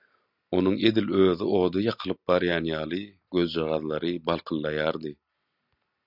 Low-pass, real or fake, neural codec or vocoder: 5.4 kHz; real; none